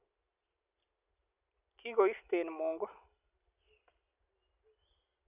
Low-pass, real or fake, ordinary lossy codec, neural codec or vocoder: 3.6 kHz; real; none; none